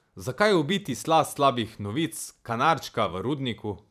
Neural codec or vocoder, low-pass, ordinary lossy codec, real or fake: vocoder, 44.1 kHz, 128 mel bands every 512 samples, BigVGAN v2; 14.4 kHz; none; fake